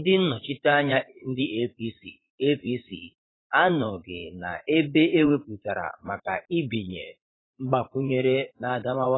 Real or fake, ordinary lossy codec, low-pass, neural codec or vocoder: fake; AAC, 16 kbps; 7.2 kHz; vocoder, 44.1 kHz, 80 mel bands, Vocos